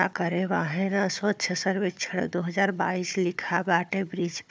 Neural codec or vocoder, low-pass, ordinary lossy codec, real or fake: codec, 16 kHz, 4 kbps, FunCodec, trained on Chinese and English, 50 frames a second; none; none; fake